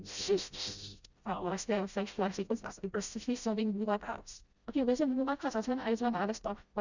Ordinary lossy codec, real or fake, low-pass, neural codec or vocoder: Opus, 64 kbps; fake; 7.2 kHz; codec, 16 kHz, 0.5 kbps, FreqCodec, smaller model